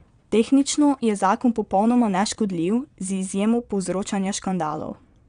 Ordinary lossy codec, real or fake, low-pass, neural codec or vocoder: none; fake; 9.9 kHz; vocoder, 22.05 kHz, 80 mel bands, Vocos